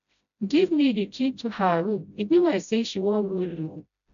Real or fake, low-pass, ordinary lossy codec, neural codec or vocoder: fake; 7.2 kHz; none; codec, 16 kHz, 0.5 kbps, FreqCodec, smaller model